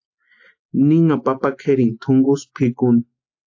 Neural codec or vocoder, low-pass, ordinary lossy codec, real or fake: none; 7.2 kHz; AAC, 48 kbps; real